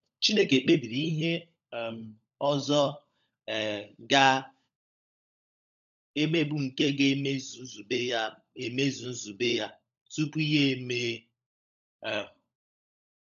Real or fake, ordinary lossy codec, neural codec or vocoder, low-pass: fake; AAC, 96 kbps; codec, 16 kHz, 16 kbps, FunCodec, trained on LibriTTS, 50 frames a second; 7.2 kHz